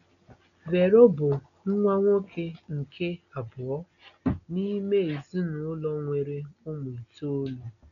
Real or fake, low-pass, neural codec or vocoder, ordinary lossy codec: real; 7.2 kHz; none; none